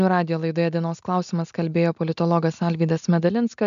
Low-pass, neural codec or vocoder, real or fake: 7.2 kHz; none; real